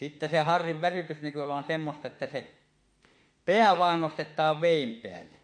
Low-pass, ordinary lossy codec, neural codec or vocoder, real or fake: 9.9 kHz; MP3, 48 kbps; autoencoder, 48 kHz, 32 numbers a frame, DAC-VAE, trained on Japanese speech; fake